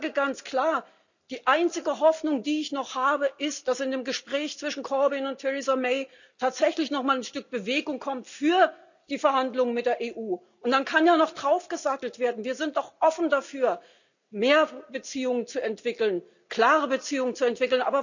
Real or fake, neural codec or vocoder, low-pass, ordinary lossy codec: real; none; 7.2 kHz; none